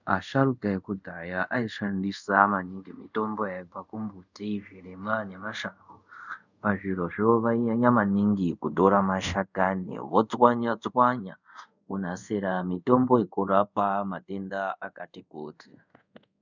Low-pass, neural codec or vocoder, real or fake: 7.2 kHz; codec, 24 kHz, 0.5 kbps, DualCodec; fake